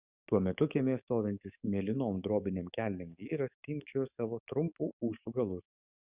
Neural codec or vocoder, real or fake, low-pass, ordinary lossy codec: codec, 44.1 kHz, 7.8 kbps, DAC; fake; 3.6 kHz; Opus, 64 kbps